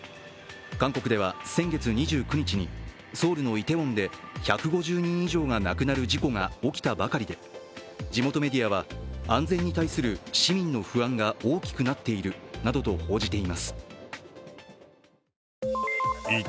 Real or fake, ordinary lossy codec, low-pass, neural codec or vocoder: real; none; none; none